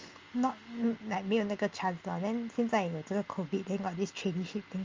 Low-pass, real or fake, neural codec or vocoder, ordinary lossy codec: 7.2 kHz; real; none; Opus, 32 kbps